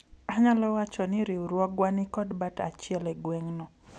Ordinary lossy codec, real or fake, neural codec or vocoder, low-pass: none; real; none; none